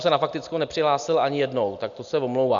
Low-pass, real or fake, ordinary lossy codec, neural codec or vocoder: 7.2 kHz; real; MP3, 96 kbps; none